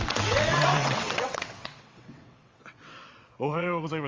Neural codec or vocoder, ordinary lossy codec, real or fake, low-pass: vocoder, 22.05 kHz, 80 mel bands, WaveNeXt; Opus, 32 kbps; fake; 7.2 kHz